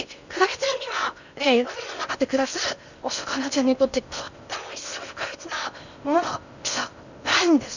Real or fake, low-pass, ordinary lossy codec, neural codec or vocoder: fake; 7.2 kHz; none; codec, 16 kHz in and 24 kHz out, 0.6 kbps, FocalCodec, streaming, 2048 codes